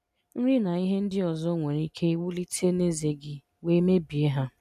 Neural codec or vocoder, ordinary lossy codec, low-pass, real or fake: none; none; 14.4 kHz; real